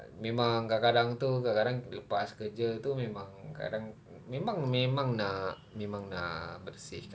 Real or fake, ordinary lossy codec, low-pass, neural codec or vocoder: real; none; none; none